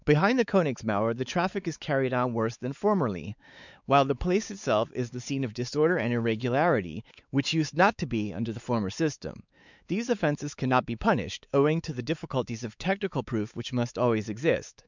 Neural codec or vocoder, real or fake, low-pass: codec, 16 kHz, 4 kbps, X-Codec, WavLM features, trained on Multilingual LibriSpeech; fake; 7.2 kHz